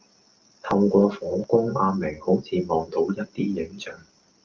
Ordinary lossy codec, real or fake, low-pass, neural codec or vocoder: Opus, 32 kbps; real; 7.2 kHz; none